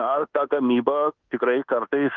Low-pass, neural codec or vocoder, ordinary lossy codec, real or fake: 7.2 kHz; codec, 24 kHz, 1.2 kbps, DualCodec; Opus, 16 kbps; fake